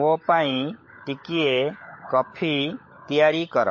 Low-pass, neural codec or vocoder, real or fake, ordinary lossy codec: 7.2 kHz; codec, 16 kHz, 16 kbps, FunCodec, trained on LibriTTS, 50 frames a second; fake; MP3, 32 kbps